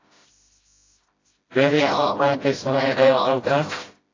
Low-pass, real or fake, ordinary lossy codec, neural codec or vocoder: 7.2 kHz; fake; AAC, 32 kbps; codec, 16 kHz, 0.5 kbps, FreqCodec, smaller model